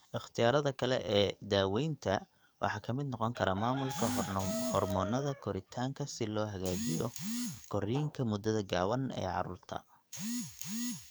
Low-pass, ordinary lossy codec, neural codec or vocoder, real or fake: none; none; codec, 44.1 kHz, 7.8 kbps, DAC; fake